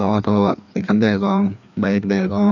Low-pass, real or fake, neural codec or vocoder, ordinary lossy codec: 7.2 kHz; fake; codec, 16 kHz, 2 kbps, FreqCodec, larger model; none